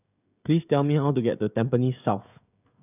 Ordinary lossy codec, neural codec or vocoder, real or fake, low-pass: none; codec, 16 kHz, 16 kbps, FreqCodec, smaller model; fake; 3.6 kHz